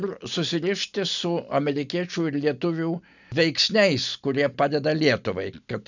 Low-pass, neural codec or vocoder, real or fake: 7.2 kHz; none; real